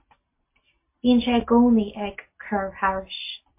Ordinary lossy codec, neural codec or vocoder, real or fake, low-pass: MP3, 24 kbps; none; real; 3.6 kHz